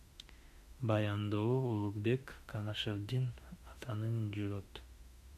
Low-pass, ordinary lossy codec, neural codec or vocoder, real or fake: 14.4 kHz; MP3, 96 kbps; autoencoder, 48 kHz, 32 numbers a frame, DAC-VAE, trained on Japanese speech; fake